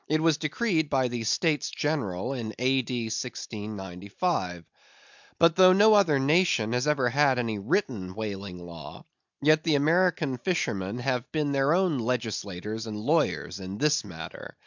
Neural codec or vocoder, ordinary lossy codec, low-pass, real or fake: none; MP3, 64 kbps; 7.2 kHz; real